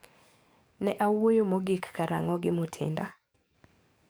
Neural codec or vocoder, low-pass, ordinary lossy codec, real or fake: codec, 44.1 kHz, 7.8 kbps, DAC; none; none; fake